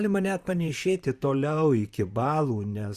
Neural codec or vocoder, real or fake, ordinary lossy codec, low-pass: vocoder, 44.1 kHz, 128 mel bands, Pupu-Vocoder; fake; Opus, 64 kbps; 14.4 kHz